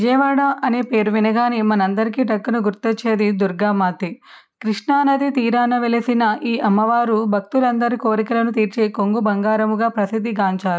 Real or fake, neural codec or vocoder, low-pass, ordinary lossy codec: real; none; none; none